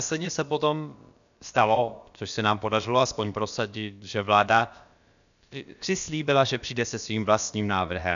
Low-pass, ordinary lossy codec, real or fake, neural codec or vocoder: 7.2 kHz; MP3, 96 kbps; fake; codec, 16 kHz, about 1 kbps, DyCAST, with the encoder's durations